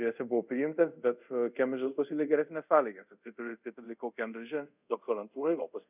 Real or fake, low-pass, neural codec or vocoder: fake; 3.6 kHz; codec, 24 kHz, 0.5 kbps, DualCodec